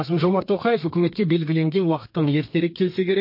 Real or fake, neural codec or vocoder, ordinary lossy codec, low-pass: fake; codec, 32 kHz, 1.9 kbps, SNAC; MP3, 32 kbps; 5.4 kHz